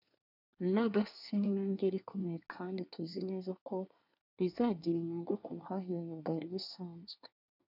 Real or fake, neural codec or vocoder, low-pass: fake; codec, 24 kHz, 1 kbps, SNAC; 5.4 kHz